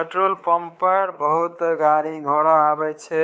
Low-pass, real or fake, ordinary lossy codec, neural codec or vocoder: none; fake; none; codec, 16 kHz, 4 kbps, X-Codec, WavLM features, trained on Multilingual LibriSpeech